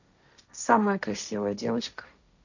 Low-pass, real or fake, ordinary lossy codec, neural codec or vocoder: 7.2 kHz; fake; none; codec, 16 kHz, 1.1 kbps, Voila-Tokenizer